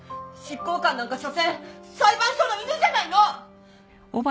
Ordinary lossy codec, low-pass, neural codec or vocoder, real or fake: none; none; none; real